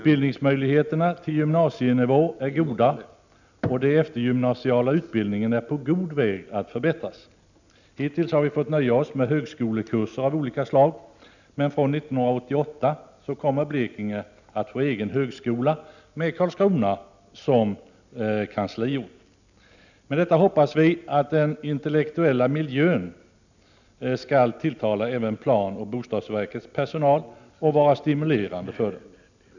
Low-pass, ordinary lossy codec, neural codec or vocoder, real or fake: 7.2 kHz; none; none; real